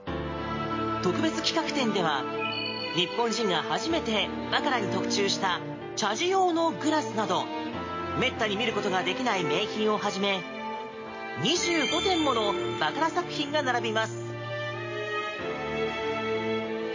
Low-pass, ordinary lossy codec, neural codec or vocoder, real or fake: 7.2 kHz; MP3, 32 kbps; none; real